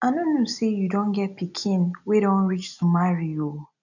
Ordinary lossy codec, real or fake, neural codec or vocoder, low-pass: none; real; none; 7.2 kHz